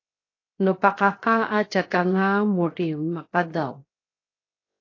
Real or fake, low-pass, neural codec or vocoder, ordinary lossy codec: fake; 7.2 kHz; codec, 16 kHz, 0.7 kbps, FocalCodec; AAC, 32 kbps